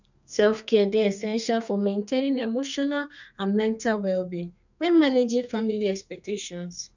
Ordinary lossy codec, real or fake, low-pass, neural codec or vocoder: none; fake; 7.2 kHz; codec, 32 kHz, 1.9 kbps, SNAC